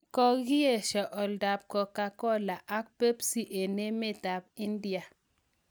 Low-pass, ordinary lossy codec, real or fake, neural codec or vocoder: none; none; real; none